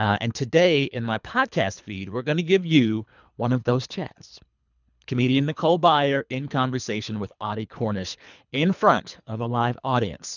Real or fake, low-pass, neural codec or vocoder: fake; 7.2 kHz; codec, 24 kHz, 3 kbps, HILCodec